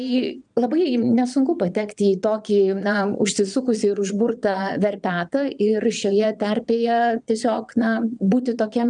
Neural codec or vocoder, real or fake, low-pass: vocoder, 22.05 kHz, 80 mel bands, Vocos; fake; 9.9 kHz